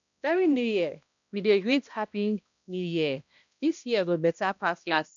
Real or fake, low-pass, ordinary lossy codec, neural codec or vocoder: fake; 7.2 kHz; none; codec, 16 kHz, 1 kbps, X-Codec, HuBERT features, trained on balanced general audio